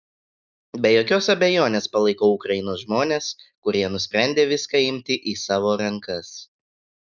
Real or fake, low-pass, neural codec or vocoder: real; 7.2 kHz; none